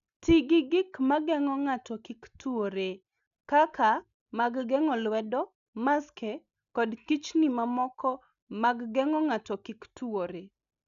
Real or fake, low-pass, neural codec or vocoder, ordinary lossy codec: real; 7.2 kHz; none; none